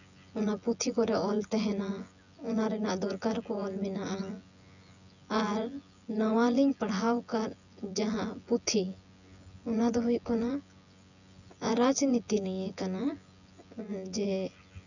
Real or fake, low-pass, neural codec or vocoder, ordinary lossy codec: fake; 7.2 kHz; vocoder, 24 kHz, 100 mel bands, Vocos; none